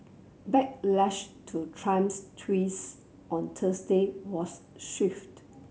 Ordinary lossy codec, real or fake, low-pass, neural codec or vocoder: none; real; none; none